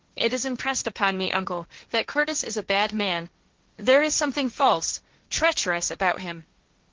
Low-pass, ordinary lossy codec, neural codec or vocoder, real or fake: 7.2 kHz; Opus, 16 kbps; codec, 16 kHz, 1.1 kbps, Voila-Tokenizer; fake